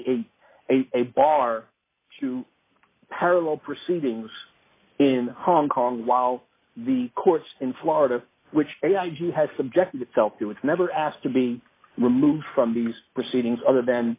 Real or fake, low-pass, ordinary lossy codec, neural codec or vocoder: real; 3.6 kHz; AAC, 24 kbps; none